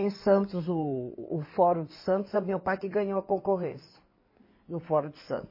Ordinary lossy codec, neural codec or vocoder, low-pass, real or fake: MP3, 24 kbps; codec, 16 kHz in and 24 kHz out, 2.2 kbps, FireRedTTS-2 codec; 5.4 kHz; fake